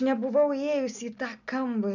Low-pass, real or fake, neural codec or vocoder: 7.2 kHz; real; none